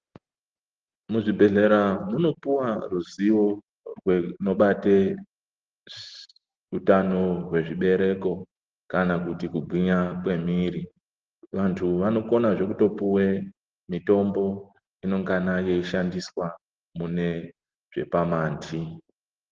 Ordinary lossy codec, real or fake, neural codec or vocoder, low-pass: Opus, 16 kbps; real; none; 7.2 kHz